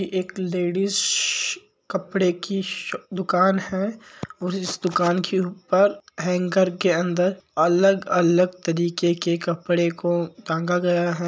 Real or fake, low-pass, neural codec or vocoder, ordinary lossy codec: real; none; none; none